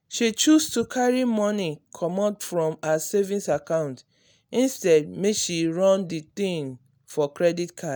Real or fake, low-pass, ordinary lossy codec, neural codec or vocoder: real; none; none; none